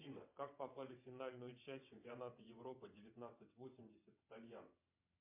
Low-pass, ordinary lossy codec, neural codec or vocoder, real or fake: 3.6 kHz; MP3, 32 kbps; vocoder, 22.05 kHz, 80 mel bands, WaveNeXt; fake